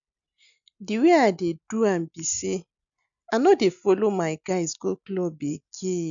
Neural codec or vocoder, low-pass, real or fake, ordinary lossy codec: none; 7.2 kHz; real; none